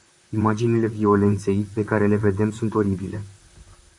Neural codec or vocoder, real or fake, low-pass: vocoder, 44.1 kHz, 128 mel bands, Pupu-Vocoder; fake; 10.8 kHz